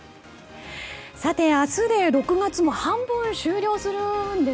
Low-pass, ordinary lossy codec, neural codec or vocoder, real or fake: none; none; none; real